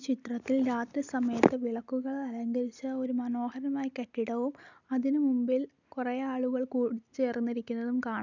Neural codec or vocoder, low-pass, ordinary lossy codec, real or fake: none; 7.2 kHz; none; real